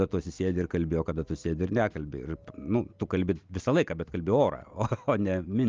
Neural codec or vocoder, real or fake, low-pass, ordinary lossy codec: none; real; 7.2 kHz; Opus, 24 kbps